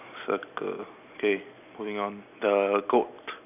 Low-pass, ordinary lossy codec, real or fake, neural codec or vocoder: 3.6 kHz; none; real; none